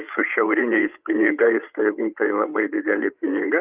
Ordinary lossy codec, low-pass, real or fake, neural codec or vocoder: Opus, 24 kbps; 3.6 kHz; fake; codec, 16 kHz, 8 kbps, FreqCodec, larger model